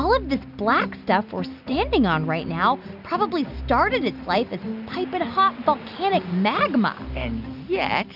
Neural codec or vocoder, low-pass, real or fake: none; 5.4 kHz; real